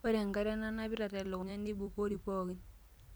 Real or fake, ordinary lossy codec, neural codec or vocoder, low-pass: fake; none; vocoder, 44.1 kHz, 128 mel bands every 256 samples, BigVGAN v2; none